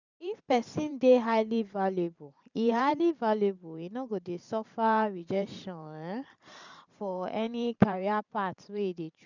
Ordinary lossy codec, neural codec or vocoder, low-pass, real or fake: none; vocoder, 44.1 kHz, 128 mel bands every 512 samples, BigVGAN v2; 7.2 kHz; fake